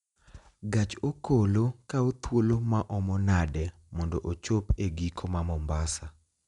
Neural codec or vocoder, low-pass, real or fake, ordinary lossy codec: none; 10.8 kHz; real; none